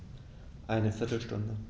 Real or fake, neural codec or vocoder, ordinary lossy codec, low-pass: real; none; none; none